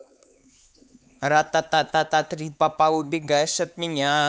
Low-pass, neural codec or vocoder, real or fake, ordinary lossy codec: none; codec, 16 kHz, 4 kbps, X-Codec, HuBERT features, trained on LibriSpeech; fake; none